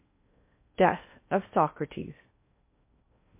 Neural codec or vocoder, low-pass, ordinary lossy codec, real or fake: codec, 16 kHz in and 24 kHz out, 0.6 kbps, FocalCodec, streaming, 2048 codes; 3.6 kHz; MP3, 24 kbps; fake